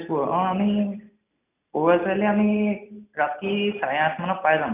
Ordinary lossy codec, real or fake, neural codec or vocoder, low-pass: none; real; none; 3.6 kHz